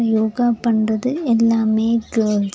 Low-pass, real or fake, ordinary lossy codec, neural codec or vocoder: none; real; none; none